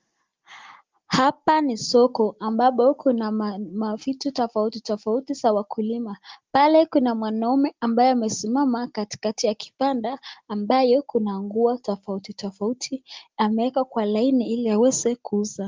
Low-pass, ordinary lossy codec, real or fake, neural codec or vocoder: 7.2 kHz; Opus, 24 kbps; real; none